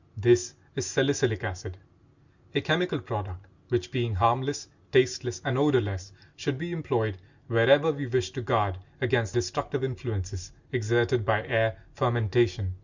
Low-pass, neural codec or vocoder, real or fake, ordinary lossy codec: 7.2 kHz; none; real; Opus, 64 kbps